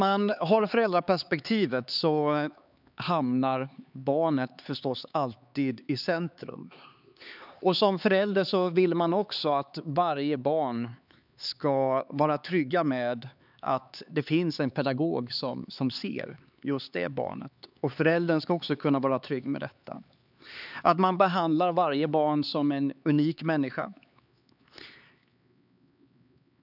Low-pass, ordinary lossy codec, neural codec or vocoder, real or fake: 5.4 kHz; none; codec, 16 kHz, 4 kbps, X-Codec, HuBERT features, trained on LibriSpeech; fake